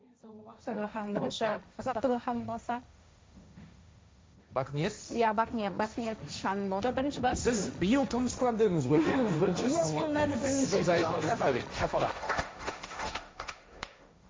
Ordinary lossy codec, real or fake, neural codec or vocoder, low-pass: none; fake; codec, 16 kHz, 1.1 kbps, Voila-Tokenizer; none